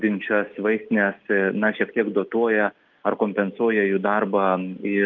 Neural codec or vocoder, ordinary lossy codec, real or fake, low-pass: none; Opus, 24 kbps; real; 7.2 kHz